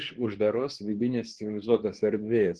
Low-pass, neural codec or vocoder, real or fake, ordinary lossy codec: 10.8 kHz; codec, 24 kHz, 0.9 kbps, WavTokenizer, medium speech release version 2; fake; Opus, 16 kbps